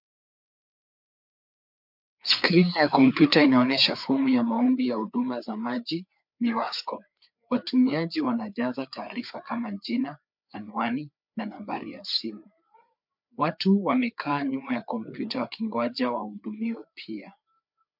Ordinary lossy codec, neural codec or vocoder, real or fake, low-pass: MP3, 48 kbps; codec, 16 kHz, 4 kbps, FreqCodec, larger model; fake; 5.4 kHz